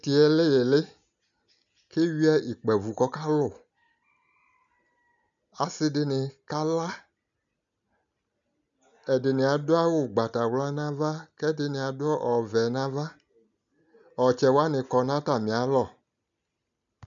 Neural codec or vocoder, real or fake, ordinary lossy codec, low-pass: none; real; MP3, 96 kbps; 7.2 kHz